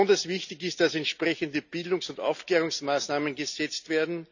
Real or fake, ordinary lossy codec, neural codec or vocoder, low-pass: real; none; none; 7.2 kHz